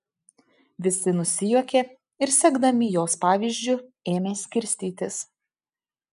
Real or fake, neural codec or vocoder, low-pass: real; none; 10.8 kHz